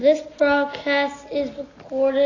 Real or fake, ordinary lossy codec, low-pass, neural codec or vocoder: real; AAC, 32 kbps; 7.2 kHz; none